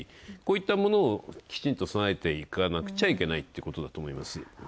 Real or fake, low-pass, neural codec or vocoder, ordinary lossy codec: real; none; none; none